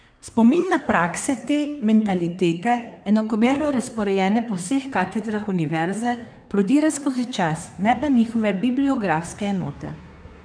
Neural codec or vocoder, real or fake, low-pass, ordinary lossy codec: codec, 24 kHz, 1 kbps, SNAC; fake; 9.9 kHz; none